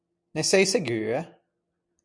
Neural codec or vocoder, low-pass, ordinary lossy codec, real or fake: none; 9.9 kHz; MP3, 64 kbps; real